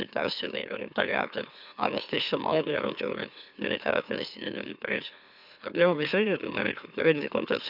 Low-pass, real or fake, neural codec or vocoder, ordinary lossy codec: 5.4 kHz; fake; autoencoder, 44.1 kHz, a latent of 192 numbers a frame, MeloTTS; none